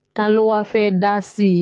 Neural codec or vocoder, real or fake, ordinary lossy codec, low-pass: codec, 44.1 kHz, 2.6 kbps, SNAC; fake; AAC, 64 kbps; 10.8 kHz